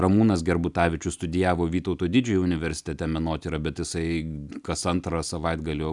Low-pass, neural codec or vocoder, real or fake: 10.8 kHz; none; real